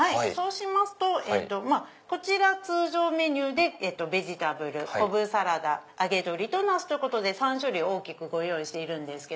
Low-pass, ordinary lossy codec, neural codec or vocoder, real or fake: none; none; none; real